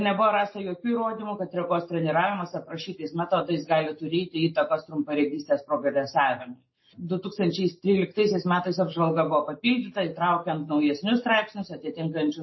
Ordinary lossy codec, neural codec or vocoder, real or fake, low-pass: MP3, 24 kbps; none; real; 7.2 kHz